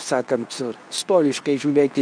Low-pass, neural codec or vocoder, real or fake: 9.9 kHz; codec, 24 kHz, 0.9 kbps, WavTokenizer, medium speech release version 2; fake